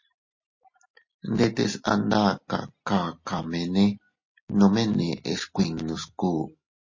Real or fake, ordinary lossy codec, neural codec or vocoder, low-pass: real; MP3, 32 kbps; none; 7.2 kHz